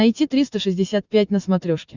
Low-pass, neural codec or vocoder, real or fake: 7.2 kHz; none; real